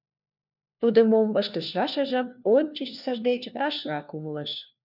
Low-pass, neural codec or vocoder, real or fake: 5.4 kHz; codec, 16 kHz, 1 kbps, FunCodec, trained on LibriTTS, 50 frames a second; fake